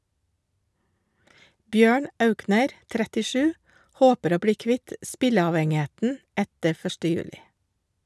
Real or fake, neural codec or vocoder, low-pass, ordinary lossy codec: real; none; none; none